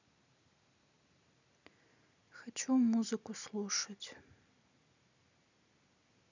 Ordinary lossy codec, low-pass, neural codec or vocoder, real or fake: none; 7.2 kHz; none; real